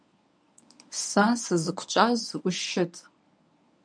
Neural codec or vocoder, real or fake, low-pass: codec, 24 kHz, 0.9 kbps, WavTokenizer, medium speech release version 1; fake; 9.9 kHz